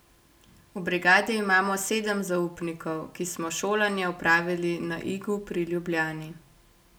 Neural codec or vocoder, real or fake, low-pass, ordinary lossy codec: none; real; none; none